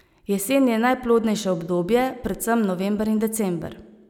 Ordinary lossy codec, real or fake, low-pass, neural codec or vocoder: none; real; 19.8 kHz; none